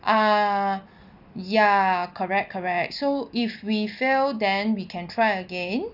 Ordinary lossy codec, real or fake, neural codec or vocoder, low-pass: none; real; none; 5.4 kHz